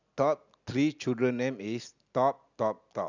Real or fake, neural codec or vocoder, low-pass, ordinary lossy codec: real; none; 7.2 kHz; none